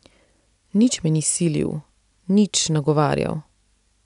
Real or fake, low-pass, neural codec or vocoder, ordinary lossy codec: real; 10.8 kHz; none; none